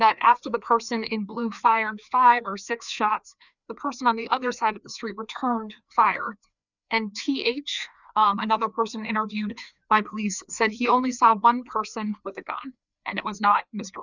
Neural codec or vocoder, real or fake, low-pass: codec, 16 kHz, 2 kbps, FreqCodec, larger model; fake; 7.2 kHz